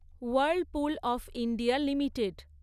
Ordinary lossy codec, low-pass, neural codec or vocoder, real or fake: none; 14.4 kHz; none; real